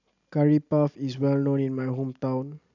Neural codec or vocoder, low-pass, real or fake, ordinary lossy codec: none; 7.2 kHz; real; none